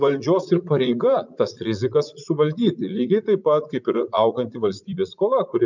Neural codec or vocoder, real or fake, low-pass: vocoder, 44.1 kHz, 80 mel bands, Vocos; fake; 7.2 kHz